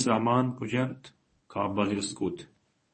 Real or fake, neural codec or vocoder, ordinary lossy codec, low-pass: fake; codec, 24 kHz, 0.9 kbps, WavTokenizer, medium speech release version 1; MP3, 32 kbps; 10.8 kHz